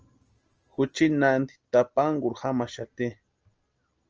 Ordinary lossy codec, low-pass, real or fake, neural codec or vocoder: Opus, 24 kbps; 7.2 kHz; real; none